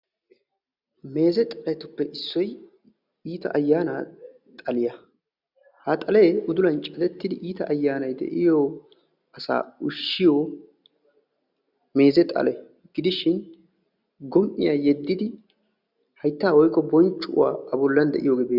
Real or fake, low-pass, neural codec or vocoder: real; 5.4 kHz; none